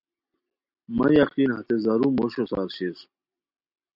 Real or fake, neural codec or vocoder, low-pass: real; none; 5.4 kHz